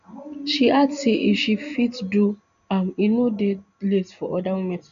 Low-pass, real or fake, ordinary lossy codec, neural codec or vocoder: 7.2 kHz; real; none; none